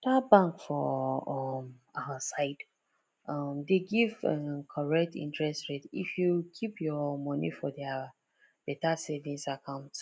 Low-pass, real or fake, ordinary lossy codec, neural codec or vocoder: none; real; none; none